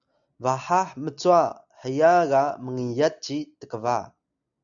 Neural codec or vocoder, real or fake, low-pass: none; real; 7.2 kHz